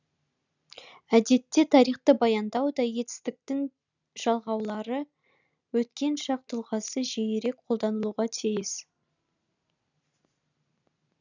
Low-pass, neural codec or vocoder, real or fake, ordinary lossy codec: 7.2 kHz; none; real; none